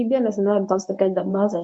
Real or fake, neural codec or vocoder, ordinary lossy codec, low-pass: fake; codec, 24 kHz, 0.9 kbps, WavTokenizer, medium speech release version 1; MP3, 48 kbps; 10.8 kHz